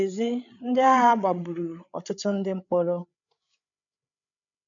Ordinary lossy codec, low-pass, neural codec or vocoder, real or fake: none; 7.2 kHz; codec, 16 kHz, 8 kbps, FreqCodec, larger model; fake